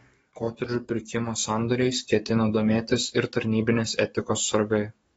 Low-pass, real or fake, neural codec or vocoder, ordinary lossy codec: 19.8 kHz; fake; codec, 44.1 kHz, 7.8 kbps, DAC; AAC, 24 kbps